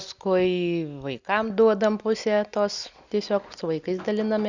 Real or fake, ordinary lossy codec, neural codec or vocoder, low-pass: real; Opus, 64 kbps; none; 7.2 kHz